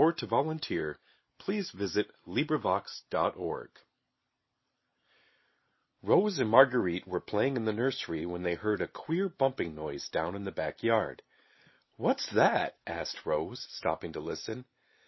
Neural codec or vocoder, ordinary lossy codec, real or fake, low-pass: none; MP3, 24 kbps; real; 7.2 kHz